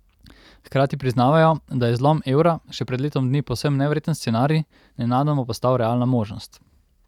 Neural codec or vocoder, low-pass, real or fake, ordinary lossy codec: none; 19.8 kHz; real; none